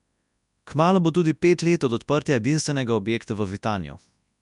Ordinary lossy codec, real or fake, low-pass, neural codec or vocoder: none; fake; 10.8 kHz; codec, 24 kHz, 0.9 kbps, WavTokenizer, large speech release